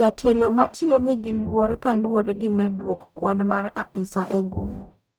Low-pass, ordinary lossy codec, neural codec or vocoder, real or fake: none; none; codec, 44.1 kHz, 0.9 kbps, DAC; fake